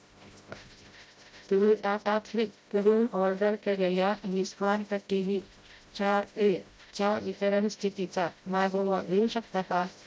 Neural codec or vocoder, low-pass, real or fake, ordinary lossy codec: codec, 16 kHz, 0.5 kbps, FreqCodec, smaller model; none; fake; none